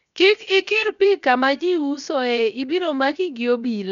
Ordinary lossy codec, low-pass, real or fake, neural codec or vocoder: none; 7.2 kHz; fake; codec, 16 kHz, 0.7 kbps, FocalCodec